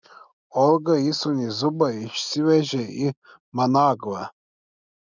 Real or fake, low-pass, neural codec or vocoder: real; 7.2 kHz; none